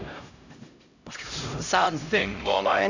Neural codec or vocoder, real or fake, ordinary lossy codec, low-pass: codec, 16 kHz, 0.5 kbps, X-Codec, HuBERT features, trained on LibriSpeech; fake; none; 7.2 kHz